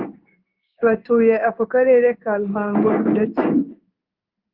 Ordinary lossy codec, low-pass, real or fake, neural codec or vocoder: Opus, 24 kbps; 5.4 kHz; fake; codec, 16 kHz in and 24 kHz out, 1 kbps, XY-Tokenizer